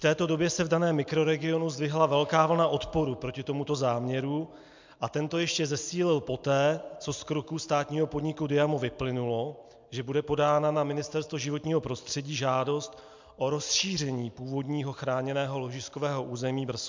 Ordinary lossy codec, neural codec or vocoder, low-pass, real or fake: MP3, 64 kbps; none; 7.2 kHz; real